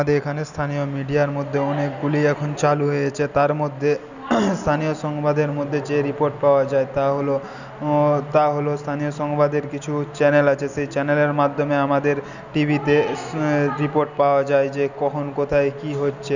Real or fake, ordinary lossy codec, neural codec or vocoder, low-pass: real; none; none; 7.2 kHz